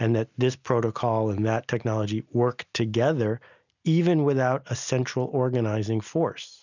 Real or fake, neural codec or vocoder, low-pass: real; none; 7.2 kHz